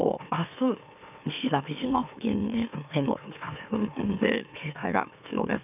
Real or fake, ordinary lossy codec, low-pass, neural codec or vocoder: fake; none; 3.6 kHz; autoencoder, 44.1 kHz, a latent of 192 numbers a frame, MeloTTS